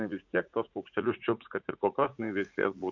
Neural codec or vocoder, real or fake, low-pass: vocoder, 44.1 kHz, 80 mel bands, Vocos; fake; 7.2 kHz